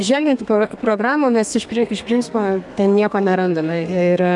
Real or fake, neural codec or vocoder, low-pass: fake; codec, 32 kHz, 1.9 kbps, SNAC; 10.8 kHz